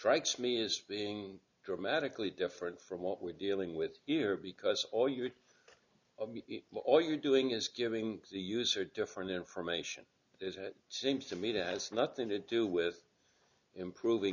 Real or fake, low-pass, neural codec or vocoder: real; 7.2 kHz; none